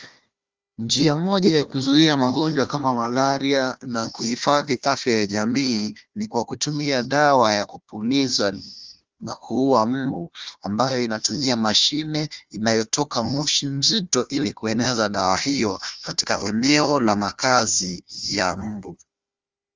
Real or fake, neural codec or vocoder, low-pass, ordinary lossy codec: fake; codec, 16 kHz, 1 kbps, FunCodec, trained on Chinese and English, 50 frames a second; 7.2 kHz; Opus, 32 kbps